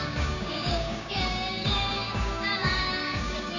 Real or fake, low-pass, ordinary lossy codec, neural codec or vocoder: fake; 7.2 kHz; none; codec, 16 kHz, 6 kbps, DAC